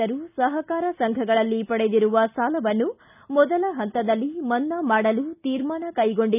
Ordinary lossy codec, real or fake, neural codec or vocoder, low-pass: none; real; none; 3.6 kHz